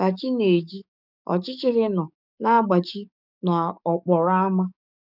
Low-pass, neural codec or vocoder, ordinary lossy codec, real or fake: 5.4 kHz; codec, 44.1 kHz, 7.8 kbps, DAC; none; fake